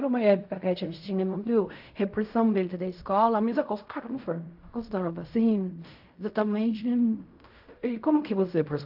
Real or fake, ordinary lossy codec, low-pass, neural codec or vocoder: fake; AAC, 48 kbps; 5.4 kHz; codec, 16 kHz in and 24 kHz out, 0.4 kbps, LongCat-Audio-Codec, fine tuned four codebook decoder